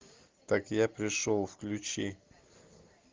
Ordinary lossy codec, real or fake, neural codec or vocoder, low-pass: Opus, 32 kbps; real; none; 7.2 kHz